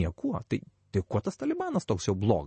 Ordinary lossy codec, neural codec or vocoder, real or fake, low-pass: MP3, 32 kbps; none; real; 10.8 kHz